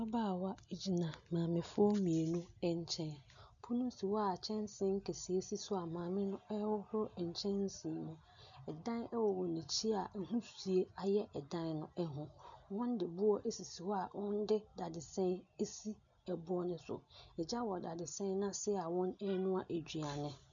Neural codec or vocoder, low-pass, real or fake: none; 7.2 kHz; real